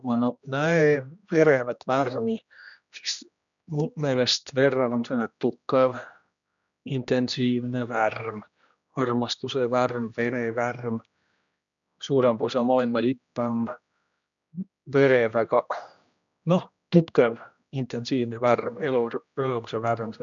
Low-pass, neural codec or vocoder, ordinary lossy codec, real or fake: 7.2 kHz; codec, 16 kHz, 1 kbps, X-Codec, HuBERT features, trained on general audio; none; fake